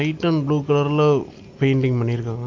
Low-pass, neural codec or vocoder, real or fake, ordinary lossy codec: 7.2 kHz; none; real; Opus, 24 kbps